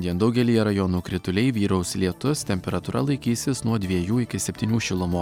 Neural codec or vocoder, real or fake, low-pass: none; real; 19.8 kHz